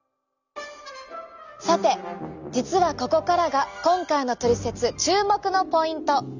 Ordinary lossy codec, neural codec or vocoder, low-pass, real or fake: none; none; 7.2 kHz; real